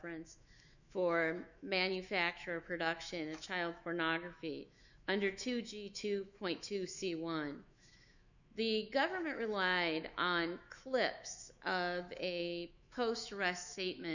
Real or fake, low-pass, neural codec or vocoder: fake; 7.2 kHz; autoencoder, 48 kHz, 128 numbers a frame, DAC-VAE, trained on Japanese speech